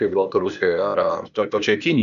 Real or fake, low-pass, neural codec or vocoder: fake; 7.2 kHz; codec, 16 kHz, 0.8 kbps, ZipCodec